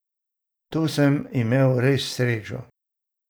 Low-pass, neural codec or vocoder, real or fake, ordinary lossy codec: none; vocoder, 44.1 kHz, 128 mel bands every 512 samples, BigVGAN v2; fake; none